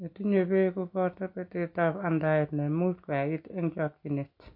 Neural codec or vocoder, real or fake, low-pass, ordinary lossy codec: none; real; 5.4 kHz; MP3, 32 kbps